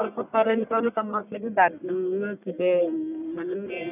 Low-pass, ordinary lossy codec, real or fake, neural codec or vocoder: 3.6 kHz; none; fake; codec, 44.1 kHz, 1.7 kbps, Pupu-Codec